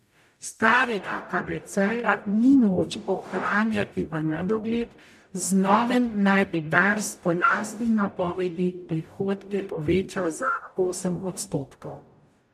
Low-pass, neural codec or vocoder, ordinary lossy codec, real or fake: 14.4 kHz; codec, 44.1 kHz, 0.9 kbps, DAC; MP3, 96 kbps; fake